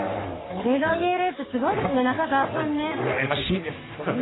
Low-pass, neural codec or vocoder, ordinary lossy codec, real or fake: 7.2 kHz; codec, 44.1 kHz, 3.4 kbps, Pupu-Codec; AAC, 16 kbps; fake